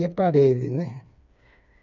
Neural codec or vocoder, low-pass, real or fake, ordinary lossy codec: codec, 16 kHz, 4 kbps, FreqCodec, smaller model; 7.2 kHz; fake; none